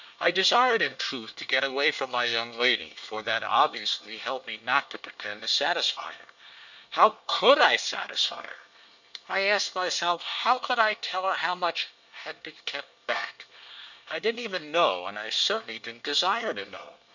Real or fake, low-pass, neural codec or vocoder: fake; 7.2 kHz; codec, 24 kHz, 1 kbps, SNAC